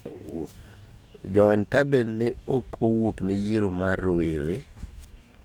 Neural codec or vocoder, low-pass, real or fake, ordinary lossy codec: codec, 44.1 kHz, 2.6 kbps, DAC; 19.8 kHz; fake; none